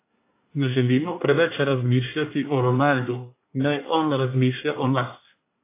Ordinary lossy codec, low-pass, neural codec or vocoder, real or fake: AAC, 24 kbps; 3.6 kHz; codec, 24 kHz, 1 kbps, SNAC; fake